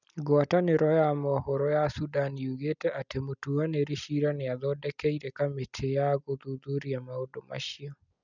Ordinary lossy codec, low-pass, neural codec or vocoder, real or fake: none; 7.2 kHz; none; real